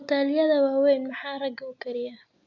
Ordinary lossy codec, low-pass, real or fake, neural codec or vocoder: none; 7.2 kHz; real; none